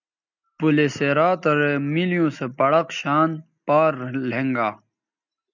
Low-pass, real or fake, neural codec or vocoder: 7.2 kHz; real; none